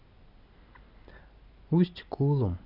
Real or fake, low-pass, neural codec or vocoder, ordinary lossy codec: real; 5.4 kHz; none; none